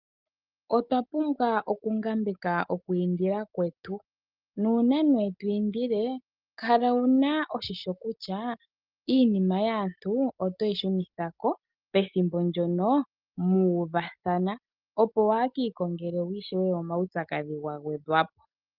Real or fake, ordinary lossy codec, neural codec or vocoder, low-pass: real; Opus, 24 kbps; none; 5.4 kHz